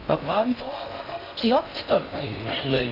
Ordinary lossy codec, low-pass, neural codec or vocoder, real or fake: none; 5.4 kHz; codec, 16 kHz in and 24 kHz out, 0.6 kbps, FocalCodec, streaming, 4096 codes; fake